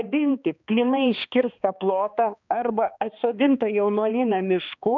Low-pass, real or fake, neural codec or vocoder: 7.2 kHz; fake; codec, 16 kHz, 2 kbps, X-Codec, HuBERT features, trained on balanced general audio